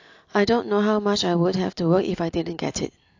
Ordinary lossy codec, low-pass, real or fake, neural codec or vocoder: AAC, 48 kbps; 7.2 kHz; real; none